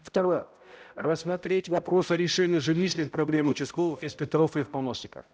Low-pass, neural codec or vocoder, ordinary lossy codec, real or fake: none; codec, 16 kHz, 0.5 kbps, X-Codec, HuBERT features, trained on balanced general audio; none; fake